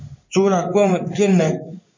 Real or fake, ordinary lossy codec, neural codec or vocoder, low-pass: fake; MP3, 64 kbps; codec, 16 kHz in and 24 kHz out, 1 kbps, XY-Tokenizer; 7.2 kHz